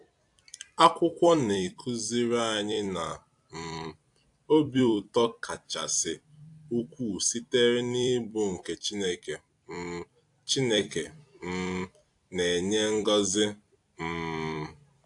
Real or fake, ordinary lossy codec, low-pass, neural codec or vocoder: fake; AAC, 64 kbps; 10.8 kHz; vocoder, 44.1 kHz, 128 mel bands every 256 samples, BigVGAN v2